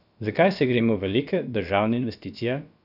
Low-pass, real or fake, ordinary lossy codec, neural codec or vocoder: 5.4 kHz; fake; none; codec, 16 kHz, about 1 kbps, DyCAST, with the encoder's durations